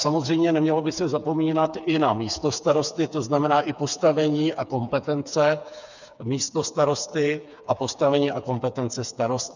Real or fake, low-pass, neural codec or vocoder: fake; 7.2 kHz; codec, 16 kHz, 4 kbps, FreqCodec, smaller model